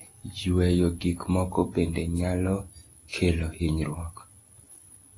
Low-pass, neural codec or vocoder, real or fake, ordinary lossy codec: 10.8 kHz; none; real; AAC, 32 kbps